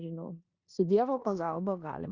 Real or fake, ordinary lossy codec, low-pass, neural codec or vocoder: fake; Opus, 64 kbps; 7.2 kHz; codec, 16 kHz in and 24 kHz out, 0.9 kbps, LongCat-Audio-Codec, four codebook decoder